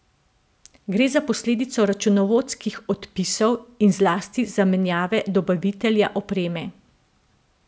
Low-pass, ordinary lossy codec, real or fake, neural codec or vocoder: none; none; real; none